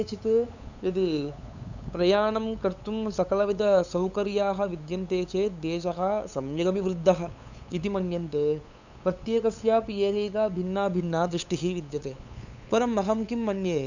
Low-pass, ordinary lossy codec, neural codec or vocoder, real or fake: 7.2 kHz; none; codec, 16 kHz, 8 kbps, FunCodec, trained on LibriTTS, 25 frames a second; fake